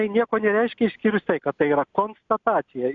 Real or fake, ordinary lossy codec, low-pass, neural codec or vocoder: real; MP3, 64 kbps; 7.2 kHz; none